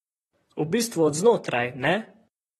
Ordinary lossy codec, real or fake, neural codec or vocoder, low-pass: AAC, 32 kbps; fake; vocoder, 44.1 kHz, 128 mel bands, Pupu-Vocoder; 19.8 kHz